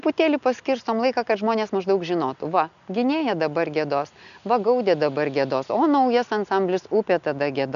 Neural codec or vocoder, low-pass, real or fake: none; 7.2 kHz; real